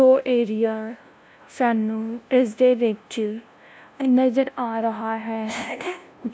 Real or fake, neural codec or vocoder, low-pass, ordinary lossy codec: fake; codec, 16 kHz, 0.5 kbps, FunCodec, trained on LibriTTS, 25 frames a second; none; none